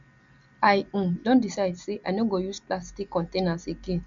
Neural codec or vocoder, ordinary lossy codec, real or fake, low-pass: none; none; real; 7.2 kHz